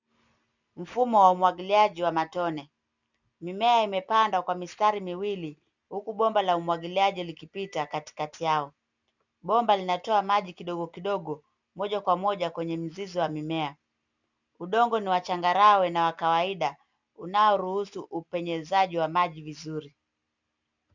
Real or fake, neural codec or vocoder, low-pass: real; none; 7.2 kHz